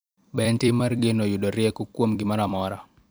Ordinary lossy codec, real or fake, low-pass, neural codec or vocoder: none; fake; none; vocoder, 44.1 kHz, 128 mel bands every 256 samples, BigVGAN v2